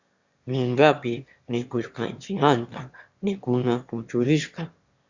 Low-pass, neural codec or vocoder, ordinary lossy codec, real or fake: 7.2 kHz; autoencoder, 22.05 kHz, a latent of 192 numbers a frame, VITS, trained on one speaker; Opus, 64 kbps; fake